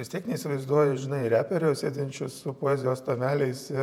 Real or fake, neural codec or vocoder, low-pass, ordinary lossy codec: fake; vocoder, 48 kHz, 128 mel bands, Vocos; 19.8 kHz; MP3, 96 kbps